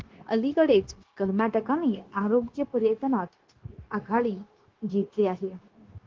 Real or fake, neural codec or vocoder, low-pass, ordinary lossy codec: fake; codec, 16 kHz, 0.9 kbps, LongCat-Audio-Codec; 7.2 kHz; Opus, 16 kbps